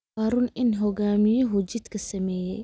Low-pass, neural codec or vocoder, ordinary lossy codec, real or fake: none; none; none; real